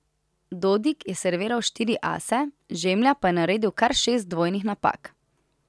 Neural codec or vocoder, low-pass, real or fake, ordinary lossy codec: none; none; real; none